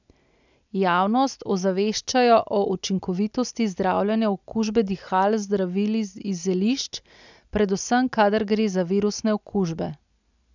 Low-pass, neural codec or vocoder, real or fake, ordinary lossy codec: 7.2 kHz; none; real; none